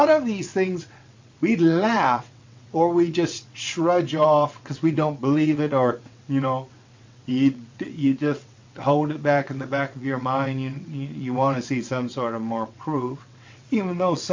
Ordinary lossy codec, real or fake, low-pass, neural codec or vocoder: MP3, 48 kbps; fake; 7.2 kHz; vocoder, 22.05 kHz, 80 mel bands, WaveNeXt